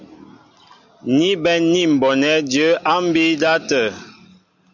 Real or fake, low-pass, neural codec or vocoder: real; 7.2 kHz; none